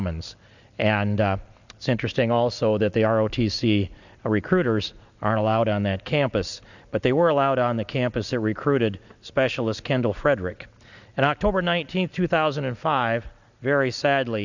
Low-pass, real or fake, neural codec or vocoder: 7.2 kHz; real; none